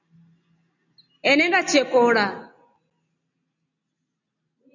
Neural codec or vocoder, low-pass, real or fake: none; 7.2 kHz; real